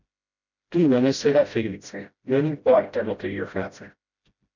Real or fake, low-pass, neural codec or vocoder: fake; 7.2 kHz; codec, 16 kHz, 0.5 kbps, FreqCodec, smaller model